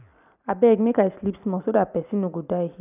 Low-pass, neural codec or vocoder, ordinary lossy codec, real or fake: 3.6 kHz; none; none; real